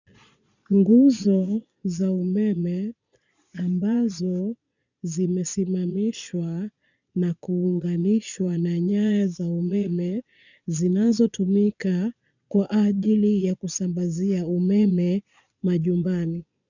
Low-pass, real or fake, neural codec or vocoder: 7.2 kHz; fake; vocoder, 22.05 kHz, 80 mel bands, Vocos